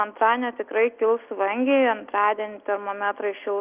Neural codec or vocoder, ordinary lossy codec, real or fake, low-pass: none; Opus, 24 kbps; real; 3.6 kHz